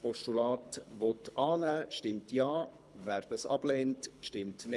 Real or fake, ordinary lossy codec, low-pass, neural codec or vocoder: fake; none; none; codec, 24 kHz, 3 kbps, HILCodec